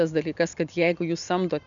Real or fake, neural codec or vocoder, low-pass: real; none; 7.2 kHz